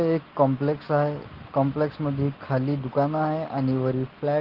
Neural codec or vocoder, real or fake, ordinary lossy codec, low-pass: none; real; Opus, 16 kbps; 5.4 kHz